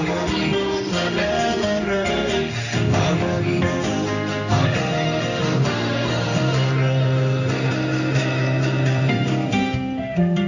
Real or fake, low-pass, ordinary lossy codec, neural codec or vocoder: fake; 7.2 kHz; none; codec, 16 kHz in and 24 kHz out, 1 kbps, XY-Tokenizer